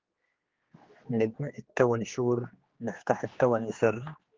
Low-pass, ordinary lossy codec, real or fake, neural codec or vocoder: 7.2 kHz; Opus, 16 kbps; fake; codec, 16 kHz, 2 kbps, X-Codec, HuBERT features, trained on general audio